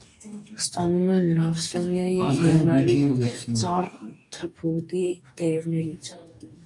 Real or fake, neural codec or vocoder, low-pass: fake; codec, 44.1 kHz, 2.6 kbps, DAC; 10.8 kHz